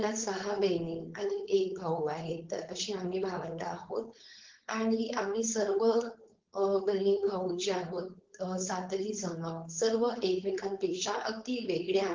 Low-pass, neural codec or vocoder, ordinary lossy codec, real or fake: 7.2 kHz; codec, 16 kHz, 4.8 kbps, FACodec; Opus, 16 kbps; fake